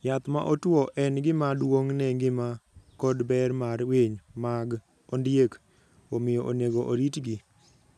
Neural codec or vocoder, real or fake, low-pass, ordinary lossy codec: none; real; none; none